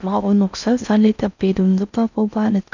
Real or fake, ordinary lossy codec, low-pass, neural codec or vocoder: fake; none; 7.2 kHz; codec, 16 kHz in and 24 kHz out, 0.6 kbps, FocalCodec, streaming, 4096 codes